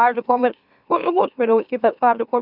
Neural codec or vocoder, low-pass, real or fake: autoencoder, 44.1 kHz, a latent of 192 numbers a frame, MeloTTS; 5.4 kHz; fake